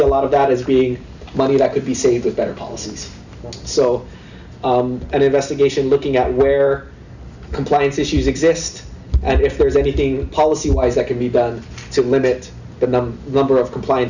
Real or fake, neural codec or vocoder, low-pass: real; none; 7.2 kHz